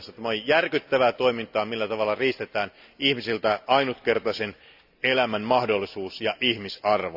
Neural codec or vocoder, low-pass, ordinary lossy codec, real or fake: none; 5.4 kHz; none; real